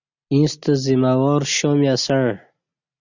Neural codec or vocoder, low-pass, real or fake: none; 7.2 kHz; real